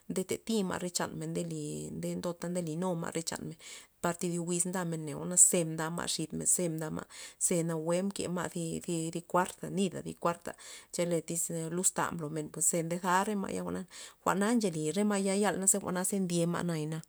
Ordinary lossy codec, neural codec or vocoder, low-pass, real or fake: none; none; none; real